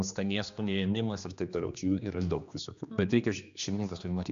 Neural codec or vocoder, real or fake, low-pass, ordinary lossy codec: codec, 16 kHz, 2 kbps, X-Codec, HuBERT features, trained on general audio; fake; 7.2 kHz; MP3, 64 kbps